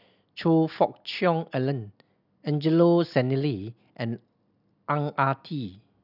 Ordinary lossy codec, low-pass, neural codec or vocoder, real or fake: none; 5.4 kHz; none; real